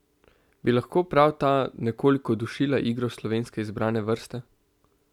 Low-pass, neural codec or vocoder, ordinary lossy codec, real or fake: 19.8 kHz; none; none; real